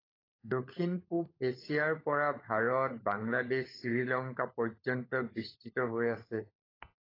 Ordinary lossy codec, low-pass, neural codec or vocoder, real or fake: AAC, 24 kbps; 5.4 kHz; codec, 16 kHz, 16 kbps, FunCodec, trained on LibriTTS, 50 frames a second; fake